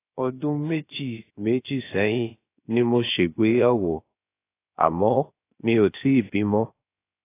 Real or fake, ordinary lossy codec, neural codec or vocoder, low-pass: fake; AAC, 24 kbps; codec, 16 kHz, 0.7 kbps, FocalCodec; 3.6 kHz